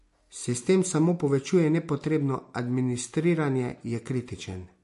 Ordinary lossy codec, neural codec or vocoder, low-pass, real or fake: MP3, 48 kbps; none; 14.4 kHz; real